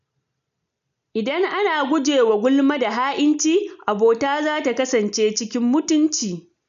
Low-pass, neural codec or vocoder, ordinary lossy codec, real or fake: 7.2 kHz; none; none; real